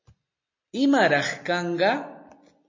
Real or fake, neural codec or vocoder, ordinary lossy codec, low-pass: real; none; MP3, 32 kbps; 7.2 kHz